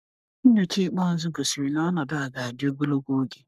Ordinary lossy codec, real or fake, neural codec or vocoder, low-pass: none; fake; codec, 44.1 kHz, 3.4 kbps, Pupu-Codec; 14.4 kHz